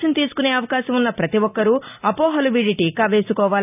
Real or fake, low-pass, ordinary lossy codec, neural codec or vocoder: real; 3.6 kHz; none; none